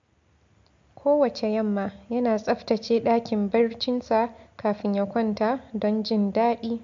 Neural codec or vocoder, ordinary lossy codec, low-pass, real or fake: none; MP3, 48 kbps; 7.2 kHz; real